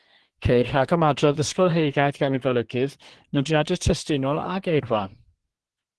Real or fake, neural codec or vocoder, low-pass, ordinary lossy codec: fake; codec, 24 kHz, 1 kbps, SNAC; 10.8 kHz; Opus, 16 kbps